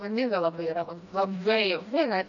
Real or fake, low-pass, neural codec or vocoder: fake; 7.2 kHz; codec, 16 kHz, 1 kbps, FreqCodec, smaller model